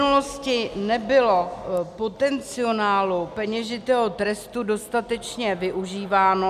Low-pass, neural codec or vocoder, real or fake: 14.4 kHz; none; real